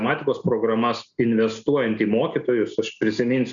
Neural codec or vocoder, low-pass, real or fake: none; 7.2 kHz; real